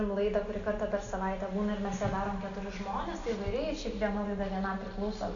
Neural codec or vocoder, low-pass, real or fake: none; 7.2 kHz; real